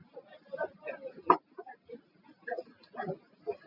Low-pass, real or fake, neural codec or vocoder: 5.4 kHz; real; none